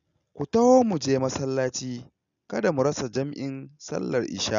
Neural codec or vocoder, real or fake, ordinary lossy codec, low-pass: none; real; MP3, 96 kbps; 7.2 kHz